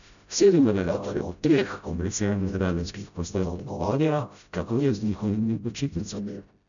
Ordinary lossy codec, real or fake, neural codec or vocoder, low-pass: none; fake; codec, 16 kHz, 0.5 kbps, FreqCodec, smaller model; 7.2 kHz